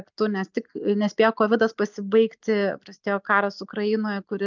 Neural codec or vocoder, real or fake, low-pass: none; real; 7.2 kHz